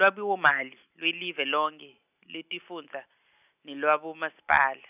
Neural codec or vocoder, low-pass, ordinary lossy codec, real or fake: none; 3.6 kHz; none; real